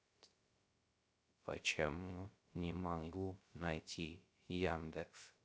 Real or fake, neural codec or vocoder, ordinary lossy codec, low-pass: fake; codec, 16 kHz, 0.3 kbps, FocalCodec; none; none